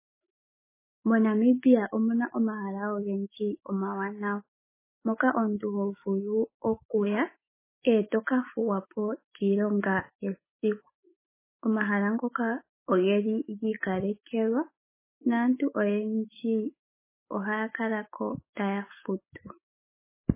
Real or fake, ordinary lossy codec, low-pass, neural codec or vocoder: fake; MP3, 16 kbps; 3.6 kHz; autoencoder, 48 kHz, 128 numbers a frame, DAC-VAE, trained on Japanese speech